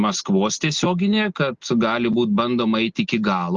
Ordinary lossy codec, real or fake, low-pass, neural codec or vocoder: Opus, 24 kbps; real; 7.2 kHz; none